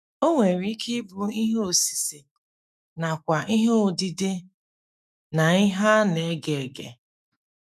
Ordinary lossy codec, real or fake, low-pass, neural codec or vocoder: none; fake; 14.4 kHz; autoencoder, 48 kHz, 128 numbers a frame, DAC-VAE, trained on Japanese speech